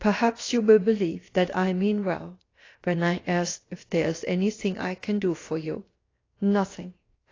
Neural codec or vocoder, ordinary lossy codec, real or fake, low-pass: codec, 16 kHz, about 1 kbps, DyCAST, with the encoder's durations; AAC, 32 kbps; fake; 7.2 kHz